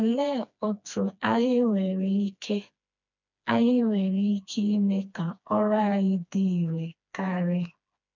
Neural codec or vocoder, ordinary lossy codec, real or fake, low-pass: codec, 16 kHz, 2 kbps, FreqCodec, smaller model; none; fake; 7.2 kHz